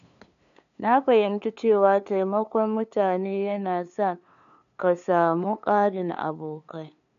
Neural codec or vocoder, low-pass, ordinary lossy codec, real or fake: codec, 16 kHz, 2 kbps, FunCodec, trained on LibriTTS, 25 frames a second; 7.2 kHz; none; fake